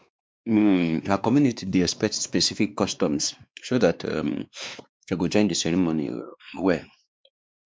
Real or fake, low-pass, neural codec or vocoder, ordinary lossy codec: fake; none; codec, 16 kHz, 2 kbps, X-Codec, WavLM features, trained on Multilingual LibriSpeech; none